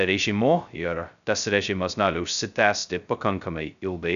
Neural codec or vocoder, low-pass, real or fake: codec, 16 kHz, 0.2 kbps, FocalCodec; 7.2 kHz; fake